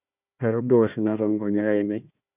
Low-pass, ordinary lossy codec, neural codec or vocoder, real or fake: 3.6 kHz; none; codec, 16 kHz, 1 kbps, FunCodec, trained on Chinese and English, 50 frames a second; fake